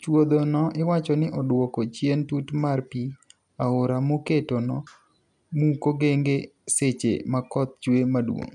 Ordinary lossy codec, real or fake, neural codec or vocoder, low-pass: none; real; none; 10.8 kHz